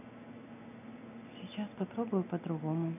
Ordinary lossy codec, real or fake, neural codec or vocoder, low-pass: none; real; none; 3.6 kHz